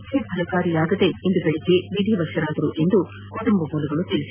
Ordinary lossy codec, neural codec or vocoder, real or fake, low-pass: none; none; real; 3.6 kHz